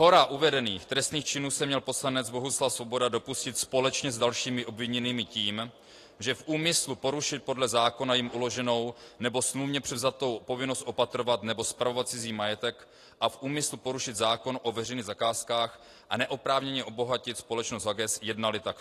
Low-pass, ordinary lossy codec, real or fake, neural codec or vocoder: 14.4 kHz; AAC, 48 kbps; real; none